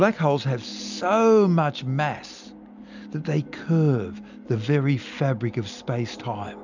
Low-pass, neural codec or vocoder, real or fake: 7.2 kHz; none; real